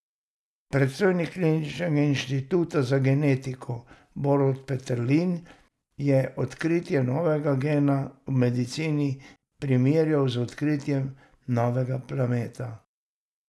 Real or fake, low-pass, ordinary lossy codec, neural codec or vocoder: real; none; none; none